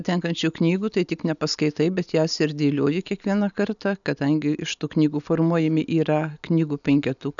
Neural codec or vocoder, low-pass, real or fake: none; 7.2 kHz; real